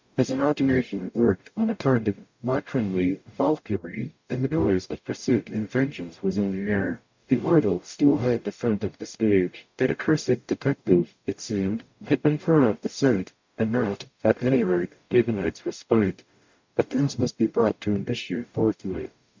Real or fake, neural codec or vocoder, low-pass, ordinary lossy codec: fake; codec, 44.1 kHz, 0.9 kbps, DAC; 7.2 kHz; MP3, 64 kbps